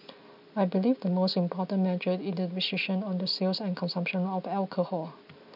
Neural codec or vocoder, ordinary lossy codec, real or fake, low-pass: none; none; real; 5.4 kHz